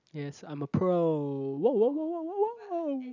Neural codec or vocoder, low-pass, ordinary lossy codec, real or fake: none; 7.2 kHz; none; real